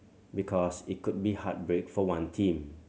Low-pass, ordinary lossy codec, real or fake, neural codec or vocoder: none; none; real; none